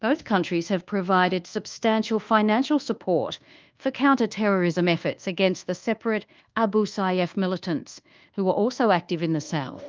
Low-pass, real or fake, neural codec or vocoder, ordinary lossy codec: 7.2 kHz; fake; codec, 24 kHz, 1.2 kbps, DualCodec; Opus, 32 kbps